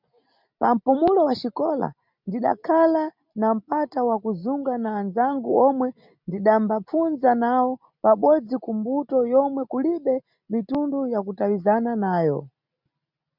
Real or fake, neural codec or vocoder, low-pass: real; none; 5.4 kHz